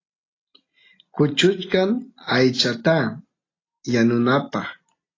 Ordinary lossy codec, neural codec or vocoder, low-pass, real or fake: AAC, 32 kbps; none; 7.2 kHz; real